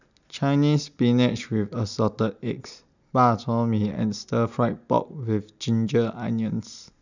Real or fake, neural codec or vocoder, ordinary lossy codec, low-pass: real; none; none; 7.2 kHz